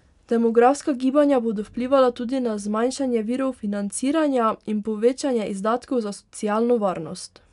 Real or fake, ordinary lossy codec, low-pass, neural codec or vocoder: real; none; 10.8 kHz; none